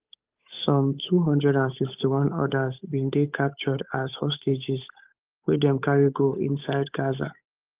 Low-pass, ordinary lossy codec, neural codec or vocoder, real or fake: 3.6 kHz; Opus, 24 kbps; codec, 16 kHz, 8 kbps, FunCodec, trained on Chinese and English, 25 frames a second; fake